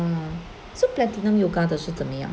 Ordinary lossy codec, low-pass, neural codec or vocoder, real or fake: none; none; none; real